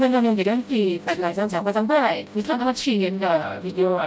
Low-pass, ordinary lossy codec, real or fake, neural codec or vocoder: none; none; fake; codec, 16 kHz, 0.5 kbps, FreqCodec, smaller model